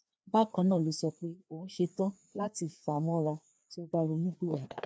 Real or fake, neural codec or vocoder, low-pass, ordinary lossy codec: fake; codec, 16 kHz, 2 kbps, FreqCodec, larger model; none; none